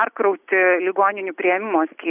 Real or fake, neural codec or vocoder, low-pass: real; none; 3.6 kHz